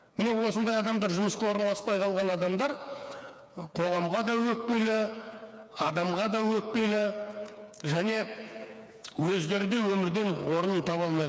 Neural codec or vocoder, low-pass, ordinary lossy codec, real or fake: codec, 16 kHz, 4 kbps, FreqCodec, smaller model; none; none; fake